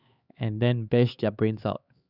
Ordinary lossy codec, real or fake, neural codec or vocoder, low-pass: Opus, 64 kbps; fake; codec, 16 kHz, 4 kbps, X-Codec, HuBERT features, trained on LibriSpeech; 5.4 kHz